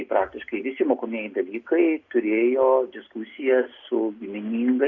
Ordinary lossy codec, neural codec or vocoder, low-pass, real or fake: Opus, 64 kbps; none; 7.2 kHz; real